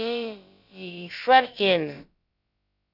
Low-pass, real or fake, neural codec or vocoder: 5.4 kHz; fake; codec, 16 kHz, about 1 kbps, DyCAST, with the encoder's durations